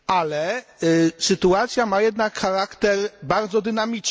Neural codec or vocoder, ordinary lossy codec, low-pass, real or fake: none; none; none; real